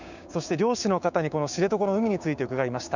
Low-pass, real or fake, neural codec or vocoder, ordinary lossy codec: 7.2 kHz; fake; codec, 16 kHz, 6 kbps, DAC; none